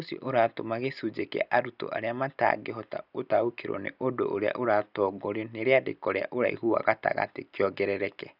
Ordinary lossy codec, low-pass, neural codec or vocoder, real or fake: none; 5.4 kHz; none; real